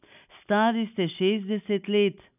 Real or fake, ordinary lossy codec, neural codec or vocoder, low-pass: real; none; none; 3.6 kHz